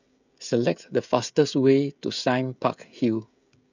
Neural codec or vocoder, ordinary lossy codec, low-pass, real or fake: codec, 16 kHz, 16 kbps, FreqCodec, smaller model; none; 7.2 kHz; fake